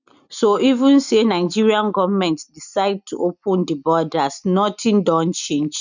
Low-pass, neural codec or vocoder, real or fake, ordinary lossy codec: 7.2 kHz; none; real; none